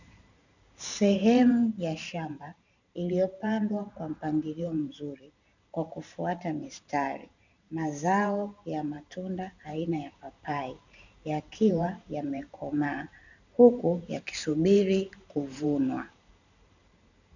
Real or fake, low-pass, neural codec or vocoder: fake; 7.2 kHz; vocoder, 44.1 kHz, 128 mel bands every 512 samples, BigVGAN v2